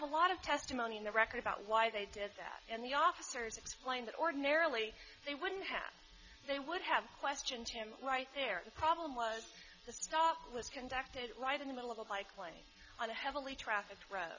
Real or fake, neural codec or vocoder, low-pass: real; none; 7.2 kHz